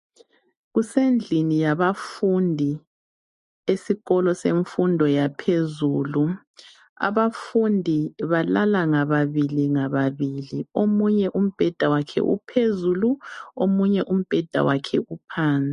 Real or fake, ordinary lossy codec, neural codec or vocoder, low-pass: real; MP3, 48 kbps; none; 14.4 kHz